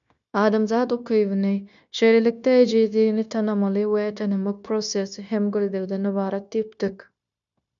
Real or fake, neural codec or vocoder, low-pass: fake; codec, 16 kHz, 0.9 kbps, LongCat-Audio-Codec; 7.2 kHz